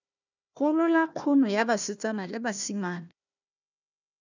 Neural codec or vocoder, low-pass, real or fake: codec, 16 kHz, 1 kbps, FunCodec, trained on Chinese and English, 50 frames a second; 7.2 kHz; fake